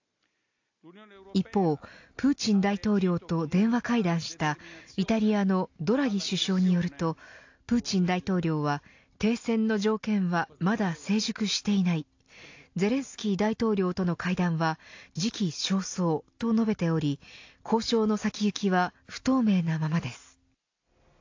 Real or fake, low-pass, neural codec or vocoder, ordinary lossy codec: real; 7.2 kHz; none; AAC, 48 kbps